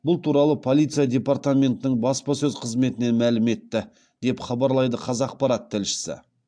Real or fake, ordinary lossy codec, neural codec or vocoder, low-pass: real; AAC, 64 kbps; none; 9.9 kHz